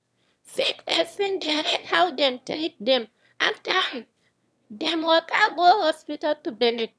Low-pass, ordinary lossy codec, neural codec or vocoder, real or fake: none; none; autoencoder, 22.05 kHz, a latent of 192 numbers a frame, VITS, trained on one speaker; fake